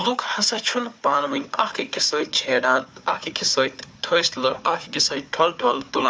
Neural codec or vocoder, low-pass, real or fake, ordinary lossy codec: codec, 16 kHz, 4 kbps, FunCodec, trained on Chinese and English, 50 frames a second; none; fake; none